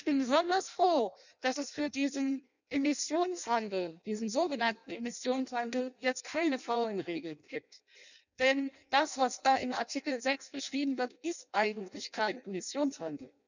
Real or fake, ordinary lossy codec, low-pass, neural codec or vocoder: fake; none; 7.2 kHz; codec, 16 kHz in and 24 kHz out, 0.6 kbps, FireRedTTS-2 codec